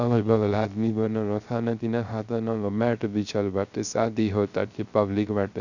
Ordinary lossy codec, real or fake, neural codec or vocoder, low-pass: none; fake; codec, 16 kHz, 0.3 kbps, FocalCodec; 7.2 kHz